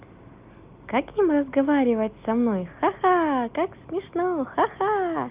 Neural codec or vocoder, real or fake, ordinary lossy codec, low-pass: none; real; Opus, 24 kbps; 3.6 kHz